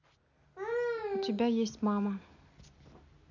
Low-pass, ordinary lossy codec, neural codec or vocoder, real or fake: 7.2 kHz; none; none; real